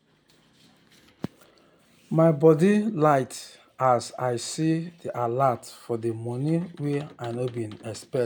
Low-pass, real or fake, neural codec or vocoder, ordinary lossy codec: none; real; none; none